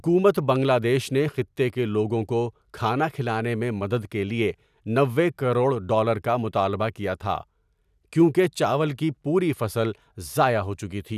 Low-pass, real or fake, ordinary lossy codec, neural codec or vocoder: 14.4 kHz; real; none; none